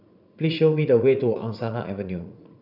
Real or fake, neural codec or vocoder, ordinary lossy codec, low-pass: fake; vocoder, 44.1 kHz, 80 mel bands, Vocos; none; 5.4 kHz